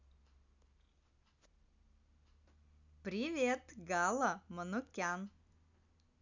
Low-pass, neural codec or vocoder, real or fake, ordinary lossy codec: 7.2 kHz; none; real; none